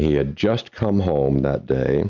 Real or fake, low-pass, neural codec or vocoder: real; 7.2 kHz; none